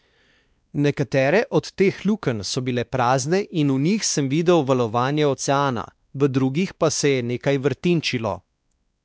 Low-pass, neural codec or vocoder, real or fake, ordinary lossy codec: none; codec, 16 kHz, 2 kbps, X-Codec, WavLM features, trained on Multilingual LibriSpeech; fake; none